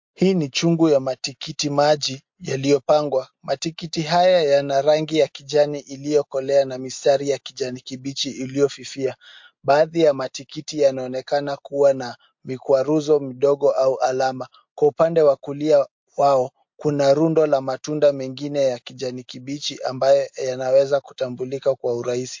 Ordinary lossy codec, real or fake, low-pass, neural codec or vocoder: MP3, 48 kbps; real; 7.2 kHz; none